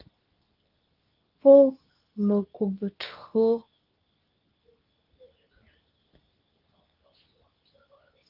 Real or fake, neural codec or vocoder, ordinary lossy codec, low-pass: fake; codec, 24 kHz, 0.9 kbps, WavTokenizer, medium speech release version 2; Opus, 32 kbps; 5.4 kHz